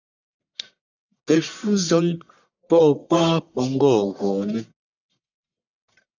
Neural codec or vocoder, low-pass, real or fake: codec, 44.1 kHz, 1.7 kbps, Pupu-Codec; 7.2 kHz; fake